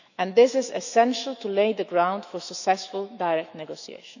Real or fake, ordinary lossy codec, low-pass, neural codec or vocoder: fake; none; 7.2 kHz; autoencoder, 48 kHz, 128 numbers a frame, DAC-VAE, trained on Japanese speech